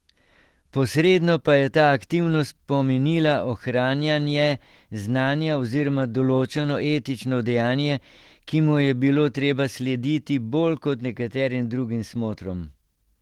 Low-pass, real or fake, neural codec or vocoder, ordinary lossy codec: 19.8 kHz; fake; autoencoder, 48 kHz, 128 numbers a frame, DAC-VAE, trained on Japanese speech; Opus, 16 kbps